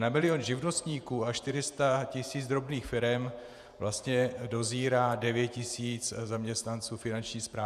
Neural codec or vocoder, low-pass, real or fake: vocoder, 48 kHz, 128 mel bands, Vocos; 14.4 kHz; fake